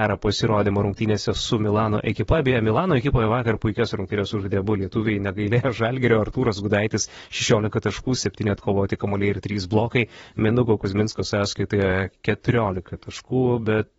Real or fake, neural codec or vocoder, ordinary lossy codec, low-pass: fake; vocoder, 44.1 kHz, 128 mel bands, Pupu-Vocoder; AAC, 24 kbps; 19.8 kHz